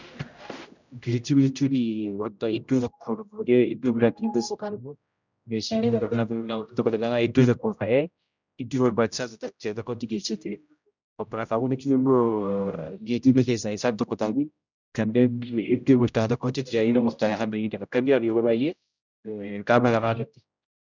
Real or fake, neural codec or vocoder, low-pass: fake; codec, 16 kHz, 0.5 kbps, X-Codec, HuBERT features, trained on general audio; 7.2 kHz